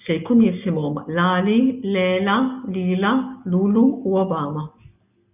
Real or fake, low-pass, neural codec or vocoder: real; 3.6 kHz; none